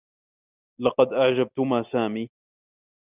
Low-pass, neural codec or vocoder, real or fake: 3.6 kHz; none; real